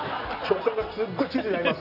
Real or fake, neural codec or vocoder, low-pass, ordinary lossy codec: real; none; 5.4 kHz; none